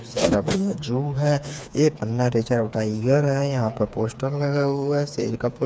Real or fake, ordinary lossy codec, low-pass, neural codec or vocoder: fake; none; none; codec, 16 kHz, 4 kbps, FreqCodec, smaller model